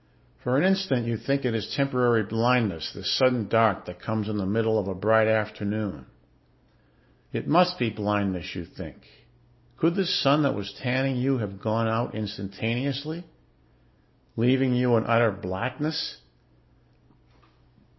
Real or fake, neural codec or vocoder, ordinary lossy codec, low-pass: real; none; MP3, 24 kbps; 7.2 kHz